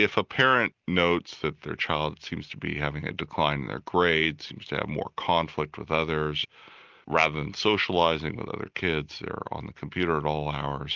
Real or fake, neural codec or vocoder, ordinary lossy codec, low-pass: real; none; Opus, 24 kbps; 7.2 kHz